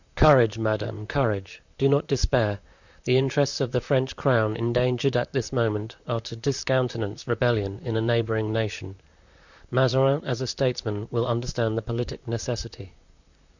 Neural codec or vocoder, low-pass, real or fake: vocoder, 44.1 kHz, 128 mel bands, Pupu-Vocoder; 7.2 kHz; fake